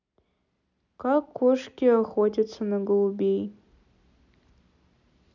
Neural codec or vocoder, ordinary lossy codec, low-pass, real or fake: none; none; 7.2 kHz; real